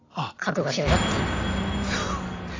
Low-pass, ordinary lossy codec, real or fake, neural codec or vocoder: 7.2 kHz; AAC, 32 kbps; real; none